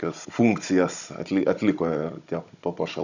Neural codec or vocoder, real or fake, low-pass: codec, 16 kHz, 16 kbps, FunCodec, trained on Chinese and English, 50 frames a second; fake; 7.2 kHz